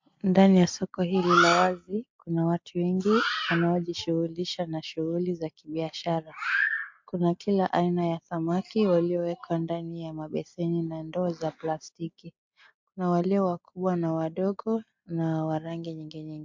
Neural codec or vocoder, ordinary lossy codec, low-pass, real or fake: none; MP3, 48 kbps; 7.2 kHz; real